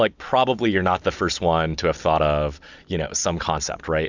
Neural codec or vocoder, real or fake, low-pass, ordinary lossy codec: none; real; 7.2 kHz; Opus, 64 kbps